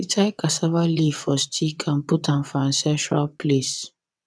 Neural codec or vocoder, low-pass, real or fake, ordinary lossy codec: vocoder, 22.05 kHz, 80 mel bands, WaveNeXt; none; fake; none